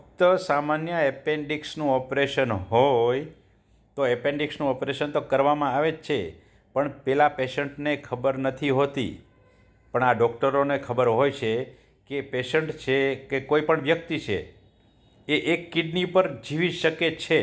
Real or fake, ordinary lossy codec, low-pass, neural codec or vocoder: real; none; none; none